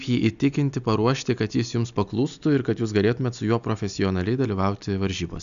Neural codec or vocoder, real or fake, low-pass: none; real; 7.2 kHz